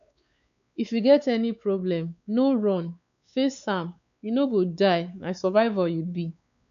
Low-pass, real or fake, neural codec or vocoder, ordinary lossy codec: 7.2 kHz; fake; codec, 16 kHz, 4 kbps, X-Codec, WavLM features, trained on Multilingual LibriSpeech; none